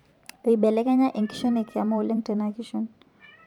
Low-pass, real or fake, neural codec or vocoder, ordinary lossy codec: 19.8 kHz; fake; vocoder, 44.1 kHz, 128 mel bands every 512 samples, BigVGAN v2; none